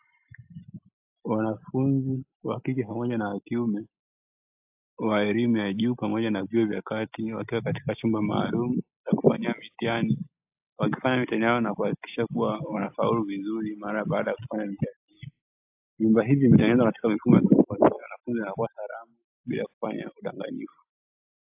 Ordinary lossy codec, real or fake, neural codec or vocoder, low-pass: AAC, 32 kbps; real; none; 3.6 kHz